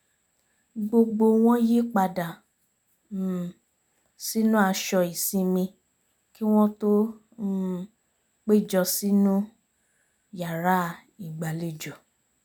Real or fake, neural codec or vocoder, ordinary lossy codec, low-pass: real; none; none; none